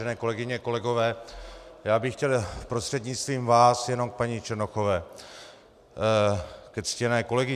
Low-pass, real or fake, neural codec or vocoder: 14.4 kHz; real; none